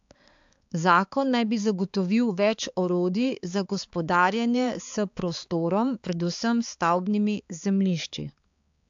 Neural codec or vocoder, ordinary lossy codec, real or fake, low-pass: codec, 16 kHz, 4 kbps, X-Codec, HuBERT features, trained on balanced general audio; AAC, 64 kbps; fake; 7.2 kHz